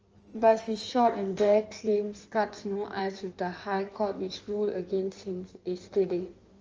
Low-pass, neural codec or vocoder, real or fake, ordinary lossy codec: 7.2 kHz; codec, 16 kHz in and 24 kHz out, 1.1 kbps, FireRedTTS-2 codec; fake; Opus, 24 kbps